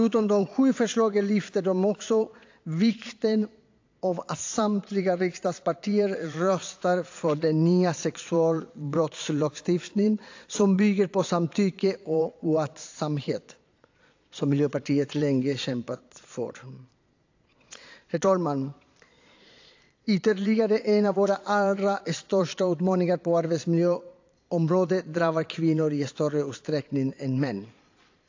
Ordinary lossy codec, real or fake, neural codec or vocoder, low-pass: AAC, 48 kbps; fake; vocoder, 22.05 kHz, 80 mel bands, Vocos; 7.2 kHz